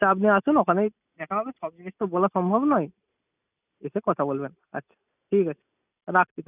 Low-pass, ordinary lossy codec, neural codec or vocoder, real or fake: 3.6 kHz; none; none; real